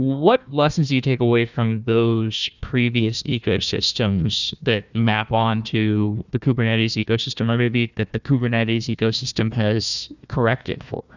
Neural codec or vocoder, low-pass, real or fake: codec, 16 kHz, 1 kbps, FunCodec, trained on Chinese and English, 50 frames a second; 7.2 kHz; fake